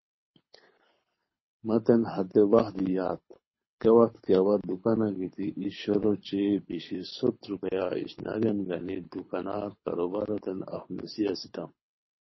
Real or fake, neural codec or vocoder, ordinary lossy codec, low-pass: fake; codec, 24 kHz, 6 kbps, HILCodec; MP3, 24 kbps; 7.2 kHz